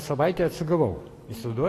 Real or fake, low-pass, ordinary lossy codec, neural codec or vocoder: real; 14.4 kHz; AAC, 48 kbps; none